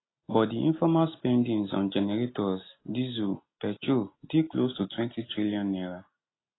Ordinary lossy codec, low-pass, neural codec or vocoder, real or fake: AAC, 16 kbps; 7.2 kHz; none; real